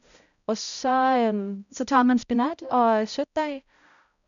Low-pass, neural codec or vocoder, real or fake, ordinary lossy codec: 7.2 kHz; codec, 16 kHz, 0.5 kbps, X-Codec, HuBERT features, trained on balanced general audio; fake; none